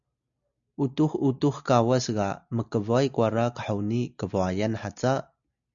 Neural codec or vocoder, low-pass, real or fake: none; 7.2 kHz; real